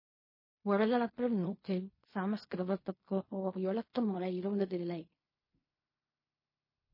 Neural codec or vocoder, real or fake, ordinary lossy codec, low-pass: codec, 16 kHz in and 24 kHz out, 0.4 kbps, LongCat-Audio-Codec, fine tuned four codebook decoder; fake; MP3, 24 kbps; 5.4 kHz